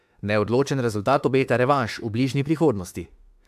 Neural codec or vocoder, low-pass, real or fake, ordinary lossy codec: autoencoder, 48 kHz, 32 numbers a frame, DAC-VAE, trained on Japanese speech; 14.4 kHz; fake; AAC, 96 kbps